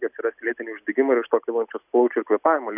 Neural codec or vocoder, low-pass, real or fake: none; 3.6 kHz; real